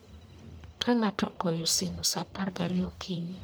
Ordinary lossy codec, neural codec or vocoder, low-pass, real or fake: none; codec, 44.1 kHz, 1.7 kbps, Pupu-Codec; none; fake